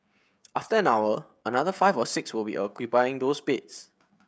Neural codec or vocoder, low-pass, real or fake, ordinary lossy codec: codec, 16 kHz, 16 kbps, FreqCodec, smaller model; none; fake; none